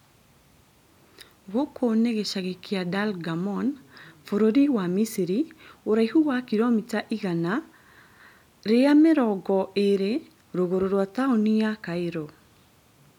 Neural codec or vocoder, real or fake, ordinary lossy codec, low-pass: none; real; none; 19.8 kHz